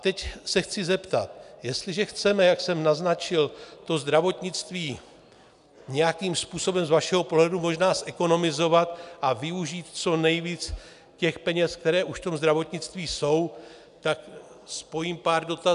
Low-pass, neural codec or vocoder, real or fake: 10.8 kHz; none; real